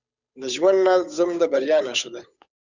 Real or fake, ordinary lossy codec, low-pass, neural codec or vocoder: fake; Opus, 64 kbps; 7.2 kHz; codec, 16 kHz, 8 kbps, FunCodec, trained on Chinese and English, 25 frames a second